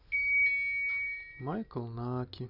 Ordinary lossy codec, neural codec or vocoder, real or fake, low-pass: none; none; real; 5.4 kHz